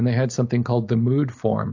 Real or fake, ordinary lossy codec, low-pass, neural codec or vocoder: real; AAC, 48 kbps; 7.2 kHz; none